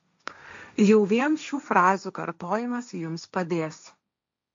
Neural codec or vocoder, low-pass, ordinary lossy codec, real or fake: codec, 16 kHz, 1.1 kbps, Voila-Tokenizer; 7.2 kHz; MP3, 48 kbps; fake